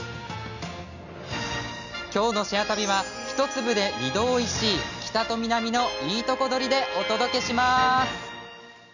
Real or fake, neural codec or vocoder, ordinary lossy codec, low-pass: real; none; none; 7.2 kHz